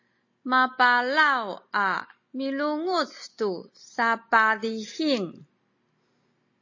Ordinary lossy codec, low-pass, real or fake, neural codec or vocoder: MP3, 32 kbps; 7.2 kHz; real; none